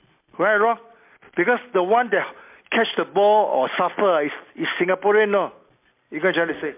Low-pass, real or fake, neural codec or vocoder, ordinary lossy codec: 3.6 kHz; real; none; MP3, 32 kbps